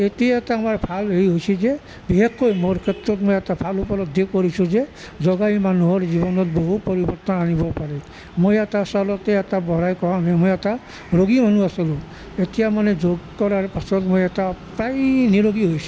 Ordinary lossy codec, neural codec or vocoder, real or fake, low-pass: none; none; real; none